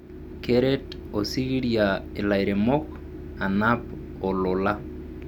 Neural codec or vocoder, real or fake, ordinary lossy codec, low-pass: none; real; none; 19.8 kHz